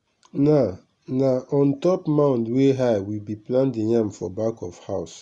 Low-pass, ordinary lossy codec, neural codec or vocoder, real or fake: 10.8 kHz; Opus, 64 kbps; none; real